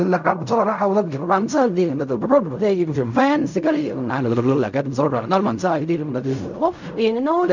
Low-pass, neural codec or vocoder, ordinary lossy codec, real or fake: 7.2 kHz; codec, 16 kHz in and 24 kHz out, 0.4 kbps, LongCat-Audio-Codec, fine tuned four codebook decoder; none; fake